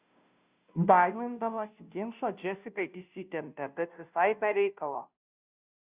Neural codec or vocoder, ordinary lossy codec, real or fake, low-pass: codec, 16 kHz, 0.5 kbps, FunCodec, trained on Chinese and English, 25 frames a second; Opus, 64 kbps; fake; 3.6 kHz